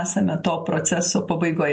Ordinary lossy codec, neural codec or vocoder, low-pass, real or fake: MP3, 48 kbps; none; 9.9 kHz; real